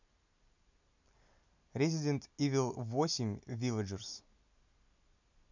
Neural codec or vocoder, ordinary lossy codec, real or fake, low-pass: none; none; real; 7.2 kHz